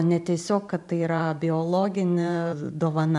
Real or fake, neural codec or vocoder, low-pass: fake; vocoder, 44.1 kHz, 128 mel bands every 512 samples, BigVGAN v2; 10.8 kHz